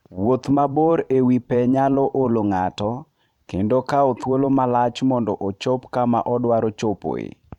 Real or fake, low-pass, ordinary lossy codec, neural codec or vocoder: fake; 19.8 kHz; MP3, 96 kbps; vocoder, 44.1 kHz, 128 mel bands every 512 samples, BigVGAN v2